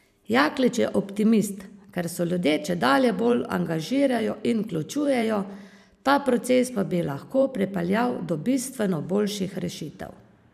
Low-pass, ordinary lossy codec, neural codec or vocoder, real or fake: 14.4 kHz; none; vocoder, 44.1 kHz, 128 mel bands every 512 samples, BigVGAN v2; fake